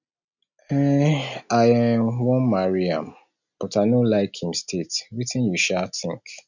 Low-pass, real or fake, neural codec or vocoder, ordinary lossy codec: 7.2 kHz; real; none; none